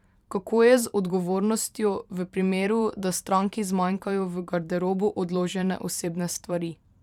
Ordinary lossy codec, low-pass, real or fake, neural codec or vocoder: none; 19.8 kHz; fake; vocoder, 44.1 kHz, 128 mel bands every 512 samples, BigVGAN v2